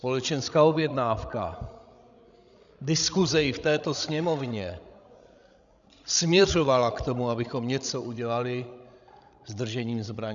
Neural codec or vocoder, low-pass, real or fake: codec, 16 kHz, 16 kbps, FreqCodec, larger model; 7.2 kHz; fake